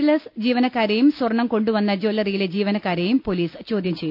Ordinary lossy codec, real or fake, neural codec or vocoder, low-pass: none; real; none; 5.4 kHz